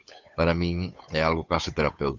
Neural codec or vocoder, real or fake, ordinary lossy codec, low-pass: codec, 16 kHz, 8 kbps, FunCodec, trained on Chinese and English, 25 frames a second; fake; MP3, 64 kbps; 7.2 kHz